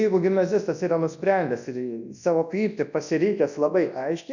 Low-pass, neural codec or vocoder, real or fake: 7.2 kHz; codec, 24 kHz, 0.9 kbps, WavTokenizer, large speech release; fake